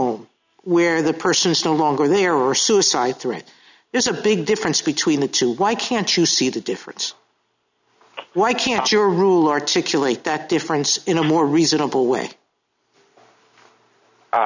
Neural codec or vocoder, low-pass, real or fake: none; 7.2 kHz; real